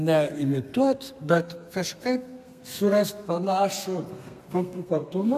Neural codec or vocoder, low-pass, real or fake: codec, 32 kHz, 1.9 kbps, SNAC; 14.4 kHz; fake